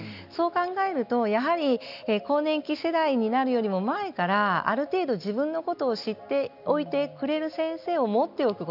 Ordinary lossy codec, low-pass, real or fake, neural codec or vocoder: none; 5.4 kHz; real; none